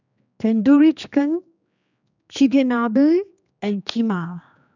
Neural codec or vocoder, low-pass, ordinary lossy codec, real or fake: codec, 16 kHz, 2 kbps, X-Codec, HuBERT features, trained on general audio; 7.2 kHz; none; fake